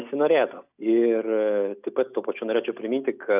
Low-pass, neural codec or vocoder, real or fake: 3.6 kHz; none; real